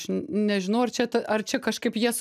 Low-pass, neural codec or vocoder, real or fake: 14.4 kHz; none; real